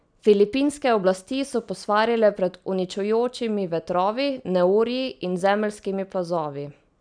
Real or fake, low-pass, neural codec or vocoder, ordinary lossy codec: real; 9.9 kHz; none; none